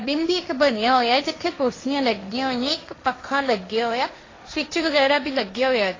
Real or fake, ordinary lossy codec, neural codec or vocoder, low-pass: fake; AAC, 32 kbps; codec, 16 kHz, 1.1 kbps, Voila-Tokenizer; 7.2 kHz